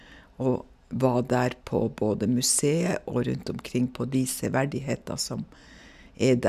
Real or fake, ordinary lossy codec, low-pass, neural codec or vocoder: real; none; 14.4 kHz; none